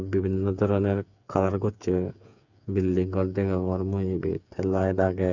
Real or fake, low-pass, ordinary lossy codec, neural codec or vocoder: fake; 7.2 kHz; none; codec, 16 kHz, 8 kbps, FreqCodec, smaller model